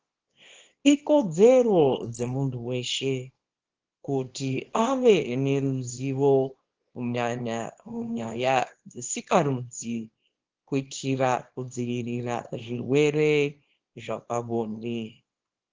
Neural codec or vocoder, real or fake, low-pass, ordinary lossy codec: codec, 24 kHz, 0.9 kbps, WavTokenizer, small release; fake; 7.2 kHz; Opus, 16 kbps